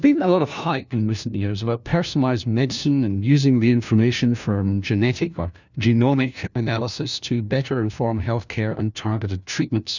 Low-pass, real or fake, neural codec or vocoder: 7.2 kHz; fake; codec, 16 kHz, 1 kbps, FunCodec, trained on LibriTTS, 50 frames a second